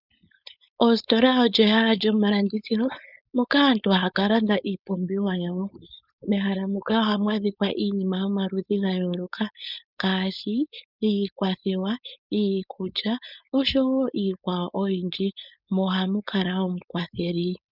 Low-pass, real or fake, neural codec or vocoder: 5.4 kHz; fake; codec, 16 kHz, 4.8 kbps, FACodec